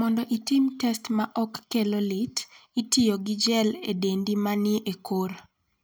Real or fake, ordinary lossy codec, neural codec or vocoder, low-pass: real; none; none; none